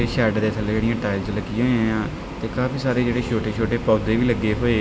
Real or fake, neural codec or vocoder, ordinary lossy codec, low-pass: real; none; none; none